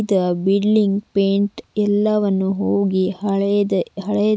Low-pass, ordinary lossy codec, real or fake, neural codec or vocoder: none; none; real; none